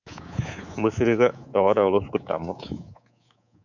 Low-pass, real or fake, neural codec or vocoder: 7.2 kHz; fake; codec, 24 kHz, 3.1 kbps, DualCodec